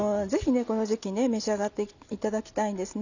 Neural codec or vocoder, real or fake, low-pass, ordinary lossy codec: none; real; 7.2 kHz; none